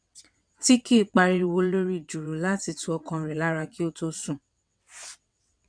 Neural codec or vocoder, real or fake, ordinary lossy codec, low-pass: vocoder, 22.05 kHz, 80 mel bands, WaveNeXt; fake; none; 9.9 kHz